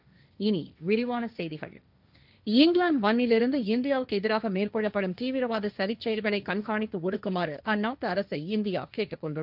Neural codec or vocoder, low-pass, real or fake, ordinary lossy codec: codec, 16 kHz, 1.1 kbps, Voila-Tokenizer; 5.4 kHz; fake; none